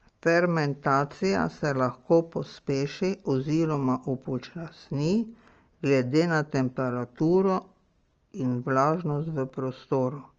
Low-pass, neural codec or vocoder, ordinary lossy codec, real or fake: 7.2 kHz; none; Opus, 24 kbps; real